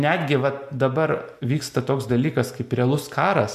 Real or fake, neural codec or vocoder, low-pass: real; none; 14.4 kHz